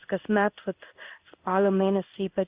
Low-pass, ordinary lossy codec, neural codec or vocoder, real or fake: 3.6 kHz; Opus, 64 kbps; codec, 16 kHz in and 24 kHz out, 1 kbps, XY-Tokenizer; fake